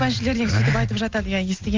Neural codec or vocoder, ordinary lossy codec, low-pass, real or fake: none; Opus, 32 kbps; 7.2 kHz; real